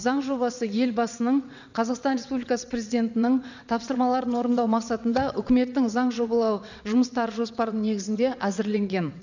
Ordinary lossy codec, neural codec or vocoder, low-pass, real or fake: none; vocoder, 22.05 kHz, 80 mel bands, WaveNeXt; 7.2 kHz; fake